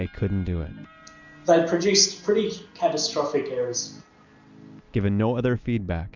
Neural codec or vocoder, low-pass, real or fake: none; 7.2 kHz; real